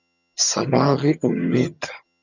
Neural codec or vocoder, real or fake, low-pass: vocoder, 22.05 kHz, 80 mel bands, HiFi-GAN; fake; 7.2 kHz